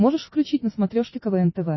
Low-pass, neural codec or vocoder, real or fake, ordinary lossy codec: 7.2 kHz; none; real; MP3, 24 kbps